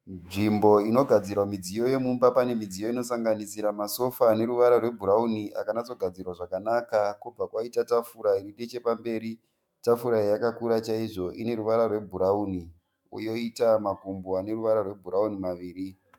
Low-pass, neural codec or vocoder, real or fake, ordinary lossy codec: 19.8 kHz; autoencoder, 48 kHz, 128 numbers a frame, DAC-VAE, trained on Japanese speech; fake; MP3, 96 kbps